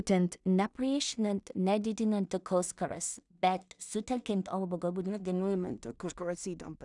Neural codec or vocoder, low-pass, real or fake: codec, 16 kHz in and 24 kHz out, 0.4 kbps, LongCat-Audio-Codec, two codebook decoder; 10.8 kHz; fake